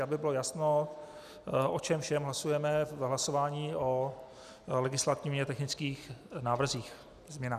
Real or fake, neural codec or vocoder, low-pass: real; none; 14.4 kHz